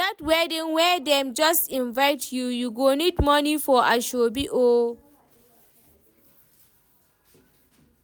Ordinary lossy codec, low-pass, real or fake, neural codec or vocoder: none; none; real; none